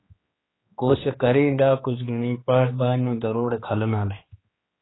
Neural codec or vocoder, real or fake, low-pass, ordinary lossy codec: codec, 16 kHz, 2 kbps, X-Codec, HuBERT features, trained on general audio; fake; 7.2 kHz; AAC, 16 kbps